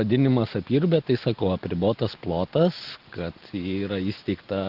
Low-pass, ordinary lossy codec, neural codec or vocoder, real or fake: 5.4 kHz; Opus, 16 kbps; none; real